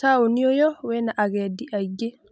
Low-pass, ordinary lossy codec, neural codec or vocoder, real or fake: none; none; none; real